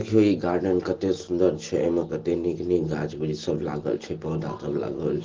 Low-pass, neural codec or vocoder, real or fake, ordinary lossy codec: 7.2 kHz; none; real; Opus, 16 kbps